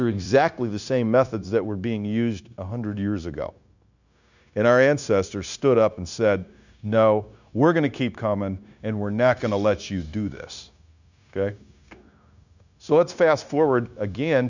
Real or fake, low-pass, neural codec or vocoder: fake; 7.2 kHz; codec, 16 kHz, 0.9 kbps, LongCat-Audio-Codec